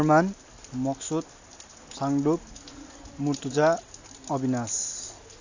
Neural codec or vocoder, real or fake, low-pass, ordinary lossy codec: none; real; 7.2 kHz; AAC, 48 kbps